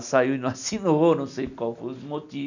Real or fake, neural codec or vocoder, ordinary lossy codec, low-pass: real; none; none; 7.2 kHz